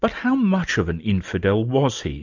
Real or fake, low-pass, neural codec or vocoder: real; 7.2 kHz; none